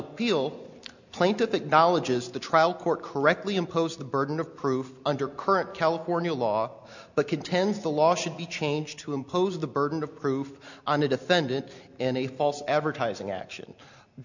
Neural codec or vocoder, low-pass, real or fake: none; 7.2 kHz; real